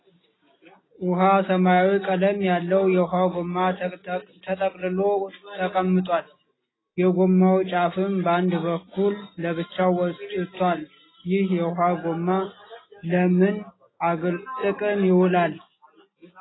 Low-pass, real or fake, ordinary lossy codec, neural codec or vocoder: 7.2 kHz; real; AAC, 16 kbps; none